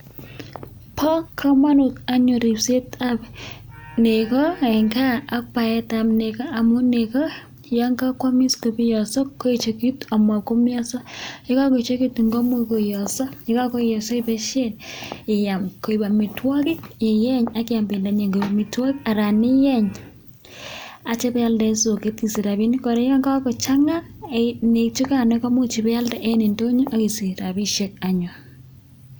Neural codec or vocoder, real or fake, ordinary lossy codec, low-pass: none; real; none; none